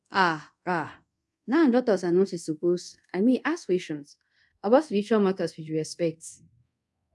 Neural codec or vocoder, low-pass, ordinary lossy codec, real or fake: codec, 24 kHz, 0.5 kbps, DualCodec; none; none; fake